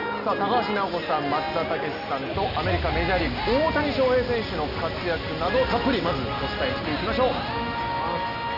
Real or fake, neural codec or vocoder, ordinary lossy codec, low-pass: real; none; none; 5.4 kHz